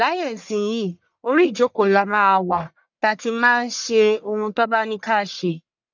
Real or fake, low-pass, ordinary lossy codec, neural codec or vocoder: fake; 7.2 kHz; none; codec, 44.1 kHz, 1.7 kbps, Pupu-Codec